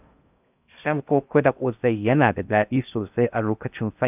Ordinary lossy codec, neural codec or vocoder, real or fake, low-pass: none; codec, 16 kHz in and 24 kHz out, 0.6 kbps, FocalCodec, streaming, 4096 codes; fake; 3.6 kHz